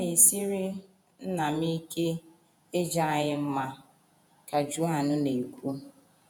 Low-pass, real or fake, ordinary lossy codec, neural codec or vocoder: none; fake; none; vocoder, 48 kHz, 128 mel bands, Vocos